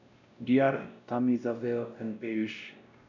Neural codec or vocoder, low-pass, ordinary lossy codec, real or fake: codec, 16 kHz, 0.5 kbps, X-Codec, WavLM features, trained on Multilingual LibriSpeech; 7.2 kHz; none; fake